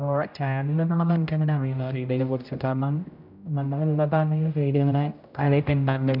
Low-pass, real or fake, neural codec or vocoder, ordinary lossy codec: 5.4 kHz; fake; codec, 16 kHz, 0.5 kbps, X-Codec, HuBERT features, trained on general audio; none